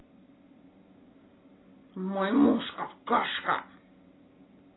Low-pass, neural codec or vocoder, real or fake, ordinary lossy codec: 7.2 kHz; none; real; AAC, 16 kbps